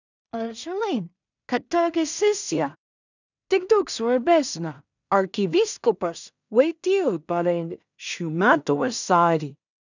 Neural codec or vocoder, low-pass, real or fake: codec, 16 kHz in and 24 kHz out, 0.4 kbps, LongCat-Audio-Codec, two codebook decoder; 7.2 kHz; fake